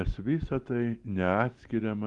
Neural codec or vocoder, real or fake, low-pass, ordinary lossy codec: none; real; 10.8 kHz; Opus, 24 kbps